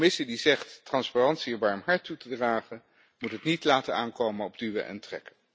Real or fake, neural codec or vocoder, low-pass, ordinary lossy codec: real; none; none; none